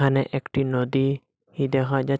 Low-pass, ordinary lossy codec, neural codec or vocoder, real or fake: none; none; none; real